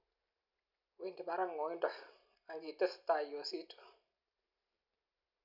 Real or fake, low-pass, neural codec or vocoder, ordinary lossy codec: real; 5.4 kHz; none; none